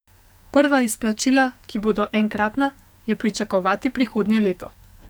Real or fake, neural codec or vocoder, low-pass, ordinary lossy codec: fake; codec, 44.1 kHz, 2.6 kbps, SNAC; none; none